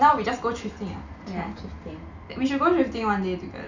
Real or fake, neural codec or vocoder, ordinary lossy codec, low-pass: real; none; none; 7.2 kHz